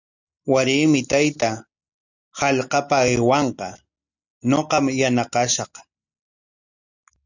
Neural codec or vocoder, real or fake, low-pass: none; real; 7.2 kHz